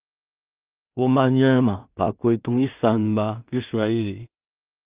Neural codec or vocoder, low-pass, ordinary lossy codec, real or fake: codec, 16 kHz in and 24 kHz out, 0.4 kbps, LongCat-Audio-Codec, two codebook decoder; 3.6 kHz; Opus, 32 kbps; fake